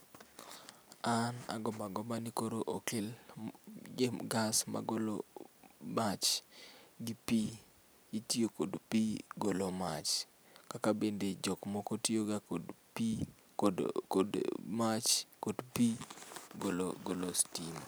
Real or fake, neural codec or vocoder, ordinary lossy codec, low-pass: real; none; none; none